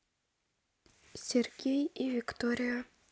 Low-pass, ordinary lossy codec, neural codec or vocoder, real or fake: none; none; none; real